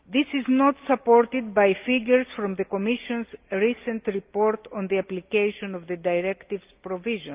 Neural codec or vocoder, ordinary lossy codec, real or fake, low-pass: none; Opus, 24 kbps; real; 3.6 kHz